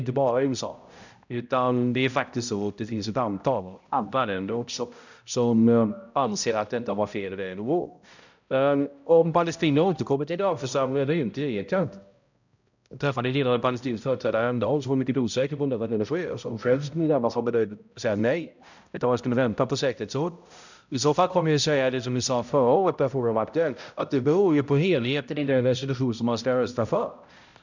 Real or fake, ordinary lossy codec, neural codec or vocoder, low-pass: fake; none; codec, 16 kHz, 0.5 kbps, X-Codec, HuBERT features, trained on balanced general audio; 7.2 kHz